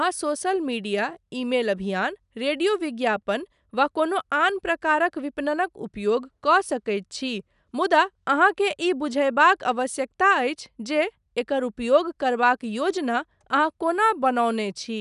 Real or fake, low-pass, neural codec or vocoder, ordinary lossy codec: real; 10.8 kHz; none; none